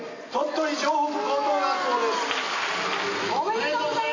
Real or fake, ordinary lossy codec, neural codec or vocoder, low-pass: real; AAC, 32 kbps; none; 7.2 kHz